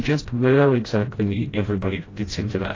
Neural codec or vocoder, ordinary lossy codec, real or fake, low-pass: codec, 16 kHz, 0.5 kbps, FreqCodec, smaller model; AAC, 32 kbps; fake; 7.2 kHz